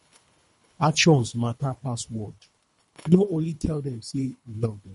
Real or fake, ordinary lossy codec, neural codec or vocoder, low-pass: fake; MP3, 48 kbps; codec, 24 kHz, 3 kbps, HILCodec; 10.8 kHz